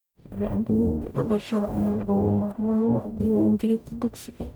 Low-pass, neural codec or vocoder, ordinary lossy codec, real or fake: none; codec, 44.1 kHz, 0.9 kbps, DAC; none; fake